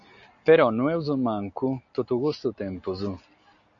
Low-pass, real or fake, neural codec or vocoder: 7.2 kHz; real; none